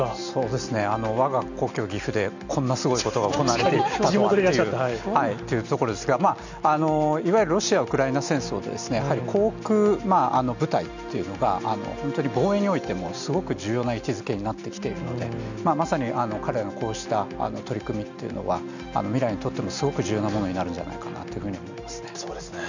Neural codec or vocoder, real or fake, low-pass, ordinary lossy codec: none; real; 7.2 kHz; none